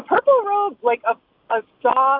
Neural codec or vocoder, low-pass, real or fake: none; 5.4 kHz; real